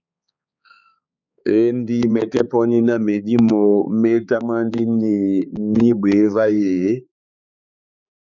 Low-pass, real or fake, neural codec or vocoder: 7.2 kHz; fake; codec, 16 kHz, 4 kbps, X-Codec, HuBERT features, trained on balanced general audio